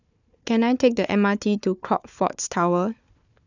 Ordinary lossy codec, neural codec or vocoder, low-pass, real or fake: none; codec, 16 kHz, 4 kbps, FunCodec, trained on Chinese and English, 50 frames a second; 7.2 kHz; fake